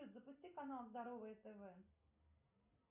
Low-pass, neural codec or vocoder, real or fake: 3.6 kHz; none; real